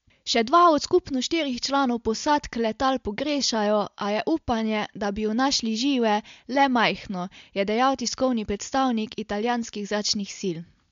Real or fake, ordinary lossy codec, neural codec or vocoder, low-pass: real; MP3, 64 kbps; none; 7.2 kHz